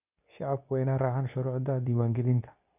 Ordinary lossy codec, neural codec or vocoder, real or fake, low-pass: none; codec, 24 kHz, 0.9 kbps, WavTokenizer, medium speech release version 2; fake; 3.6 kHz